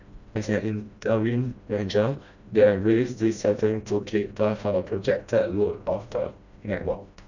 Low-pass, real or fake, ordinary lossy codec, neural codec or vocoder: 7.2 kHz; fake; none; codec, 16 kHz, 1 kbps, FreqCodec, smaller model